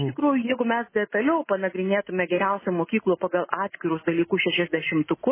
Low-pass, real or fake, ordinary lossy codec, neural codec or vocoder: 3.6 kHz; real; MP3, 16 kbps; none